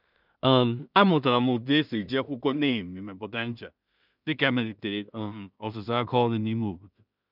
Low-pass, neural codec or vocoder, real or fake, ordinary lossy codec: 5.4 kHz; codec, 16 kHz in and 24 kHz out, 0.4 kbps, LongCat-Audio-Codec, two codebook decoder; fake; none